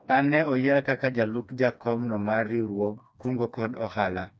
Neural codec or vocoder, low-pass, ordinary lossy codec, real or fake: codec, 16 kHz, 2 kbps, FreqCodec, smaller model; none; none; fake